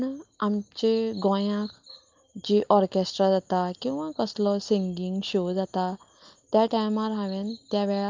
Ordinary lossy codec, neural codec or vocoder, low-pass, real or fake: Opus, 24 kbps; none; 7.2 kHz; real